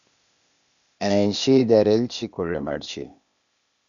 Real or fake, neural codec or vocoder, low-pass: fake; codec, 16 kHz, 0.8 kbps, ZipCodec; 7.2 kHz